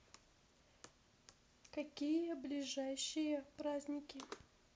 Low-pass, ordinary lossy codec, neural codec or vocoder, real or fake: none; none; none; real